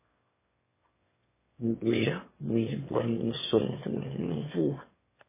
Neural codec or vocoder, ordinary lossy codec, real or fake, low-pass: autoencoder, 22.05 kHz, a latent of 192 numbers a frame, VITS, trained on one speaker; MP3, 16 kbps; fake; 3.6 kHz